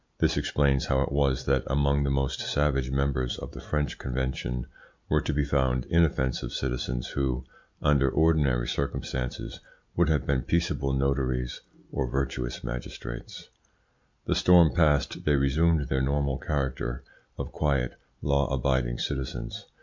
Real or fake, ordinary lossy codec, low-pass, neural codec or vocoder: real; MP3, 64 kbps; 7.2 kHz; none